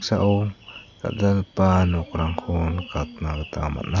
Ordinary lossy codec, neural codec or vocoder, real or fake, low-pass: none; none; real; 7.2 kHz